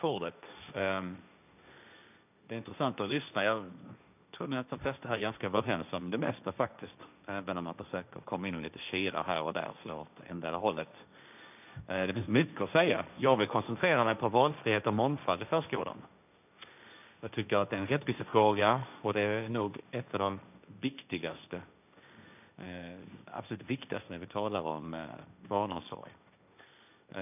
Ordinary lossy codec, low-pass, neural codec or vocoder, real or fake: none; 3.6 kHz; codec, 16 kHz, 1.1 kbps, Voila-Tokenizer; fake